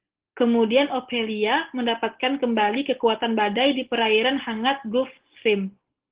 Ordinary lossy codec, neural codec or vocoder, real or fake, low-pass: Opus, 16 kbps; none; real; 3.6 kHz